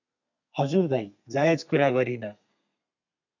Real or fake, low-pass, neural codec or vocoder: fake; 7.2 kHz; codec, 32 kHz, 1.9 kbps, SNAC